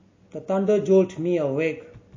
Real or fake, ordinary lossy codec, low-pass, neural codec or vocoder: real; MP3, 32 kbps; 7.2 kHz; none